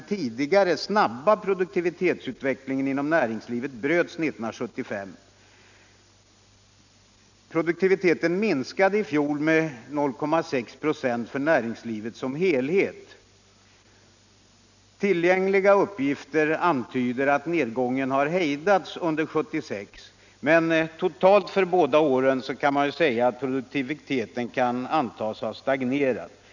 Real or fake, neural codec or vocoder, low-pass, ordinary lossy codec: real; none; 7.2 kHz; none